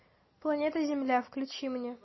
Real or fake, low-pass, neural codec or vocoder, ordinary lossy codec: real; 7.2 kHz; none; MP3, 24 kbps